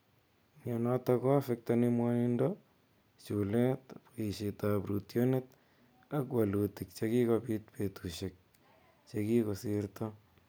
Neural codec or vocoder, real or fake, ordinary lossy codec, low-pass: none; real; none; none